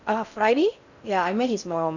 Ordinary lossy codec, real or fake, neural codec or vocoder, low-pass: none; fake; codec, 16 kHz in and 24 kHz out, 0.6 kbps, FocalCodec, streaming, 4096 codes; 7.2 kHz